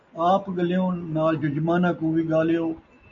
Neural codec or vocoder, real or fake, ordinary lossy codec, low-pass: none; real; AAC, 64 kbps; 7.2 kHz